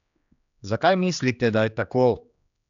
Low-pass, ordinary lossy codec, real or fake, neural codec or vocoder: 7.2 kHz; none; fake; codec, 16 kHz, 2 kbps, X-Codec, HuBERT features, trained on general audio